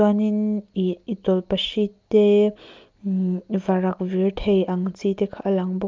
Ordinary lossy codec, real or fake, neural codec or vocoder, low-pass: Opus, 24 kbps; real; none; 7.2 kHz